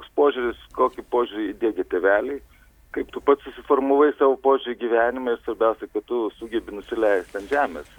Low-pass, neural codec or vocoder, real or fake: 19.8 kHz; none; real